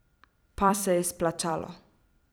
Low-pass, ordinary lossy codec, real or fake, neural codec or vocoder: none; none; fake; vocoder, 44.1 kHz, 128 mel bands every 256 samples, BigVGAN v2